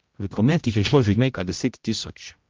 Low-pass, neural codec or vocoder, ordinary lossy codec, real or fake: 7.2 kHz; codec, 16 kHz, 0.5 kbps, X-Codec, HuBERT features, trained on general audio; Opus, 24 kbps; fake